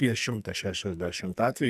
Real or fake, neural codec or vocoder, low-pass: fake; codec, 44.1 kHz, 2.6 kbps, SNAC; 14.4 kHz